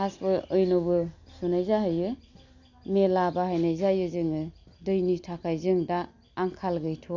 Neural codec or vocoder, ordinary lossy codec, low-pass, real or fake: none; none; 7.2 kHz; real